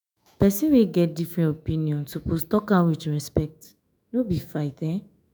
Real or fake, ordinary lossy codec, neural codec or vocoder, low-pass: fake; none; autoencoder, 48 kHz, 128 numbers a frame, DAC-VAE, trained on Japanese speech; none